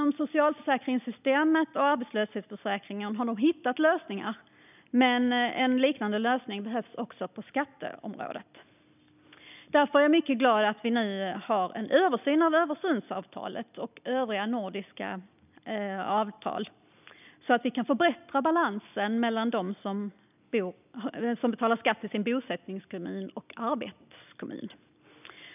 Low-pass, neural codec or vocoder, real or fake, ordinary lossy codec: 3.6 kHz; none; real; none